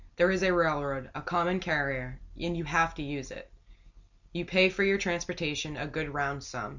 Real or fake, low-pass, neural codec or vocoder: real; 7.2 kHz; none